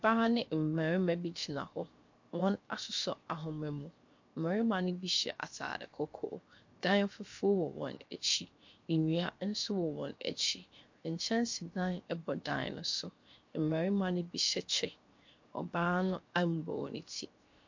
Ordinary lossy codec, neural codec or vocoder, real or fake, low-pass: MP3, 48 kbps; codec, 16 kHz, 0.7 kbps, FocalCodec; fake; 7.2 kHz